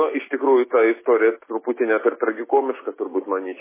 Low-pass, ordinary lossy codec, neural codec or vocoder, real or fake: 3.6 kHz; MP3, 16 kbps; none; real